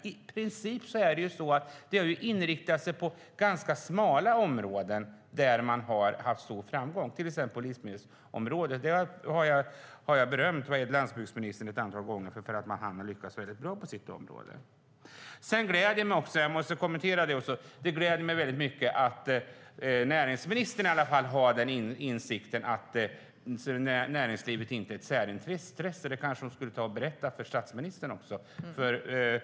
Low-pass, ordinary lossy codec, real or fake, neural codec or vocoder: none; none; real; none